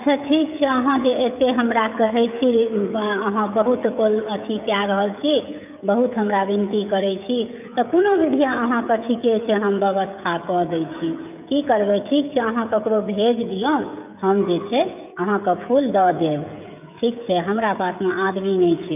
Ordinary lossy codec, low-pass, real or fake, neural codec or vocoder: none; 3.6 kHz; fake; codec, 16 kHz, 16 kbps, FreqCodec, smaller model